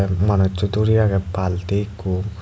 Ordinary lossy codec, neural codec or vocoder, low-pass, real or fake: none; none; none; real